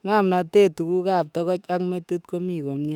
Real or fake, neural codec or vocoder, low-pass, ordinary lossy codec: fake; autoencoder, 48 kHz, 32 numbers a frame, DAC-VAE, trained on Japanese speech; 19.8 kHz; none